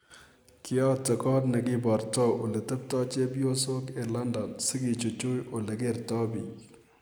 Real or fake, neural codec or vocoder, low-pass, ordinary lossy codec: real; none; none; none